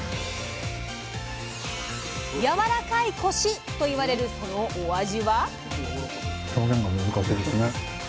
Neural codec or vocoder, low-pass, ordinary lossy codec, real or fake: none; none; none; real